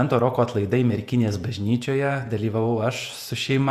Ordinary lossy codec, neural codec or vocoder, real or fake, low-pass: Opus, 64 kbps; vocoder, 48 kHz, 128 mel bands, Vocos; fake; 14.4 kHz